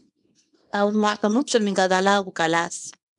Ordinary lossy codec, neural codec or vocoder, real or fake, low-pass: MP3, 96 kbps; codec, 24 kHz, 0.9 kbps, WavTokenizer, small release; fake; 10.8 kHz